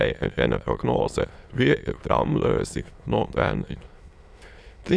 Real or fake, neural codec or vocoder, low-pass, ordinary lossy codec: fake; autoencoder, 22.05 kHz, a latent of 192 numbers a frame, VITS, trained on many speakers; none; none